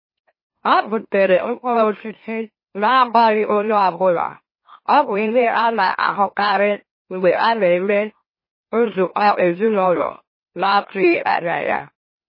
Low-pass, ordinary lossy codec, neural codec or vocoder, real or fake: 5.4 kHz; MP3, 24 kbps; autoencoder, 44.1 kHz, a latent of 192 numbers a frame, MeloTTS; fake